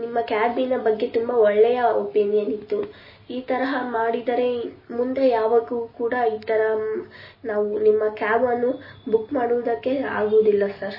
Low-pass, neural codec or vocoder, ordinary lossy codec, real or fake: 5.4 kHz; none; MP3, 24 kbps; real